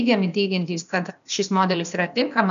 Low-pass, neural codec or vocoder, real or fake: 7.2 kHz; codec, 16 kHz, 0.8 kbps, ZipCodec; fake